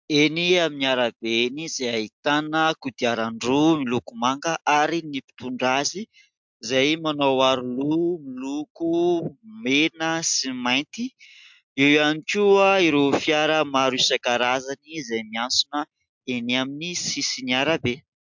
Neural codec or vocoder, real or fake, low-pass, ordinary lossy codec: none; real; 7.2 kHz; MP3, 64 kbps